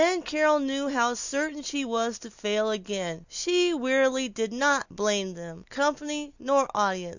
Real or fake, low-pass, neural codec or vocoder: real; 7.2 kHz; none